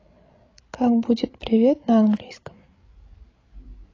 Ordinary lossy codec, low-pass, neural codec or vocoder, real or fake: AAC, 32 kbps; 7.2 kHz; codec, 16 kHz, 16 kbps, FreqCodec, larger model; fake